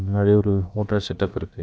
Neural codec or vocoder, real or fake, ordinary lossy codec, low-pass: codec, 16 kHz, about 1 kbps, DyCAST, with the encoder's durations; fake; none; none